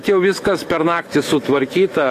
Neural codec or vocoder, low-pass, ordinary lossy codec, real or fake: autoencoder, 48 kHz, 128 numbers a frame, DAC-VAE, trained on Japanese speech; 14.4 kHz; AAC, 48 kbps; fake